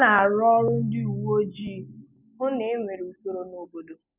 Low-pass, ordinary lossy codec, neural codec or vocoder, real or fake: 3.6 kHz; none; none; real